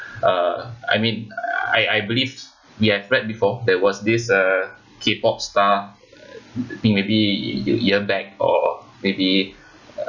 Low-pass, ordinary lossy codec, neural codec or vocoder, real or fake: 7.2 kHz; none; none; real